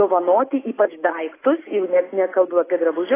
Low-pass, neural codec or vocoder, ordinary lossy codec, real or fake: 3.6 kHz; none; AAC, 16 kbps; real